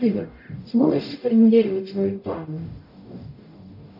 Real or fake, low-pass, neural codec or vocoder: fake; 5.4 kHz; codec, 44.1 kHz, 0.9 kbps, DAC